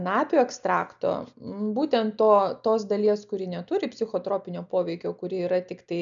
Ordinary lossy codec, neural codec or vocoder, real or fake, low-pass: MP3, 96 kbps; none; real; 7.2 kHz